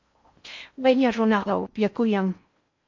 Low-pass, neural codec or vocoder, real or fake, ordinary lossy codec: 7.2 kHz; codec, 16 kHz in and 24 kHz out, 0.6 kbps, FocalCodec, streaming, 4096 codes; fake; MP3, 48 kbps